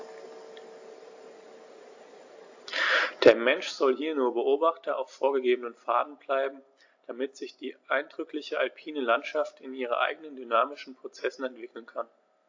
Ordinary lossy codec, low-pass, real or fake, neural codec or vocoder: AAC, 48 kbps; 7.2 kHz; real; none